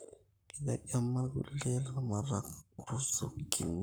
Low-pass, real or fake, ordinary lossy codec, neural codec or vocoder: none; fake; none; codec, 44.1 kHz, 7.8 kbps, Pupu-Codec